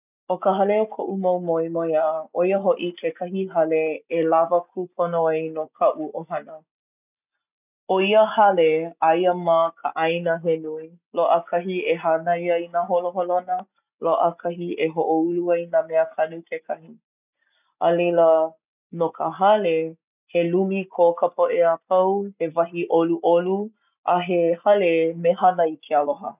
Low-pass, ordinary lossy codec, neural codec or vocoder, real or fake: 3.6 kHz; none; none; real